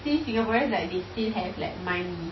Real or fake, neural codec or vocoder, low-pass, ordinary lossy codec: real; none; 7.2 kHz; MP3, 24 kbps